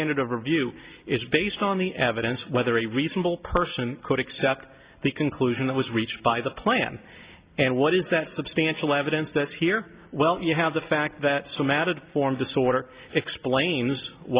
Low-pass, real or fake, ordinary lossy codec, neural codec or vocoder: 3.6 kHz; real; Opus, 64 kbps; none